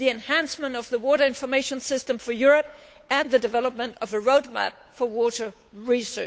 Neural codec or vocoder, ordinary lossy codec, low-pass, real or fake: codec, 16 kHz, 8 kbps, FunCodec, trained on Chinese and English, 25 frames a second; none; none; fake